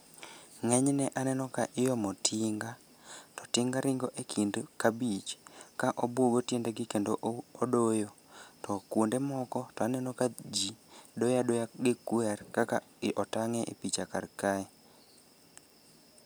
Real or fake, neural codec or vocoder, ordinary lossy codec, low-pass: real; none; none; none